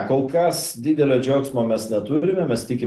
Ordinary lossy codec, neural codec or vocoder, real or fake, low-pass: Opus, 16 kbps; none; real; 14.4 kHz